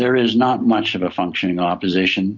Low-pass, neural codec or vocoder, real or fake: 7.2 kHz; none; real